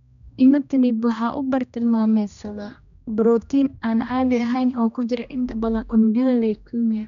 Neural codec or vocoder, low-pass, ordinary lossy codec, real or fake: codec, 16 kHz, 1 kbps, X-Codec, HuBERT features, trained on general audio; 7.2 kHz; MP3, 96 kbps; fake